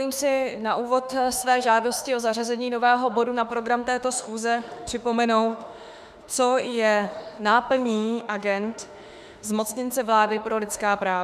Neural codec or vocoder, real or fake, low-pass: autoencoder, 48 kHz, 32 numbers a frame, DAC-VAE, trained on Japanese speech; fake; 14.4 kHz